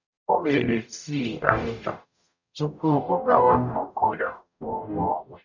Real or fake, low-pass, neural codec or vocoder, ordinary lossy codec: fake; 7.2 kHz; codec, 44.1 kHz, 0.9 kbps, DAC; none